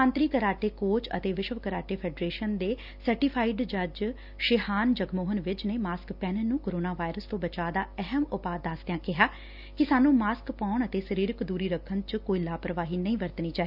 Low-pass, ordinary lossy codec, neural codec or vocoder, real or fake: 5.4 kHz; none; none; real